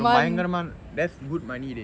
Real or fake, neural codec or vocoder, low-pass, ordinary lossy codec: real; none; none; none